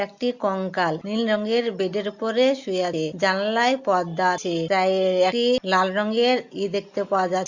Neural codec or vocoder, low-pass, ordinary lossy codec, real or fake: none; 7.2 kHz; Opus, 64 kbps; real